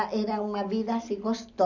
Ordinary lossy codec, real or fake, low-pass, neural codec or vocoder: none; real; 7.2 kHz; none